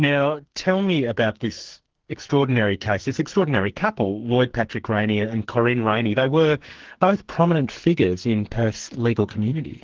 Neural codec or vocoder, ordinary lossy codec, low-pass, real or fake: codec, 44.1 kHz, 2.6 kbps, SNAC; Opus, 16 kbps; 7.2 kHz; fake